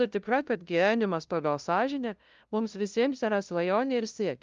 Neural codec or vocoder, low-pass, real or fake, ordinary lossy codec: codec, 16 kHz, 0.5 kbps, FunCodec, trained on LibriTTS, 25 frames a second; 7.2 kHz; fake; Opus, 32 kbps